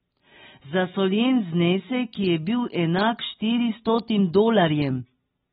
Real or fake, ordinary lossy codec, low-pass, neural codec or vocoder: real; AAC, 16 kbps; 10.8 kHz; none